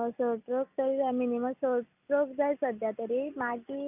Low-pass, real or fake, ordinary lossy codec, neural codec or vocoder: 3.6 kHz; real; none; none